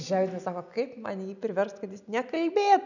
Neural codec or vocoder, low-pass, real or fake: none; 7.2 kHz; real